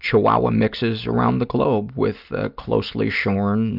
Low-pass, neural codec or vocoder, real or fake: 5.4 kHz; none; real